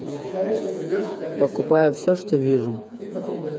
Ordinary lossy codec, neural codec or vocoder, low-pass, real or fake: none; codec, 16 kHz, 4 kbps, FreqCodec, smaller model; none; fake